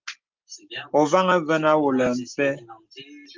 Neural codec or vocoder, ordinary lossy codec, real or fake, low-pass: none; Opus, 32 kbps; real; 7.2 kHz